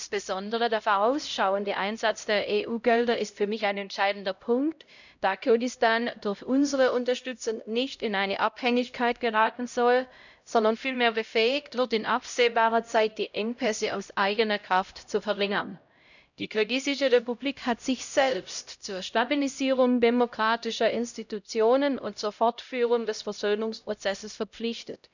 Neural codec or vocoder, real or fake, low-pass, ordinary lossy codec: codec, 16 kHz, 0.5 kbps, X-Codec, HuBERT features, trained on LibriSpeech; fake; 7.2 kHz; none